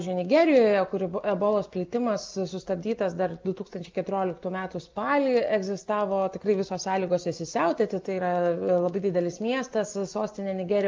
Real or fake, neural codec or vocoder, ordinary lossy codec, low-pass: real; none; Opus, 24 kbps; 7.2 kHz